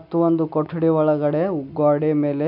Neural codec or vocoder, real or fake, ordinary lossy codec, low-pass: none; real; none; 5.4 kHz